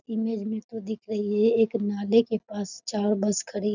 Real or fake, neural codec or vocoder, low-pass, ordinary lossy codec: real; none; 7.2 kHz; none